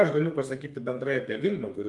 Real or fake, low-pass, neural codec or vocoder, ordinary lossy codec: fake; 10.8 kHz; codec, 32 kHz, 1.9 kbps, SNAC; Opus, 32 kbps